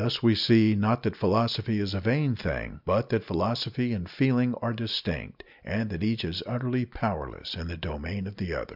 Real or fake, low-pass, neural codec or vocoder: real; 5.4 kHz; none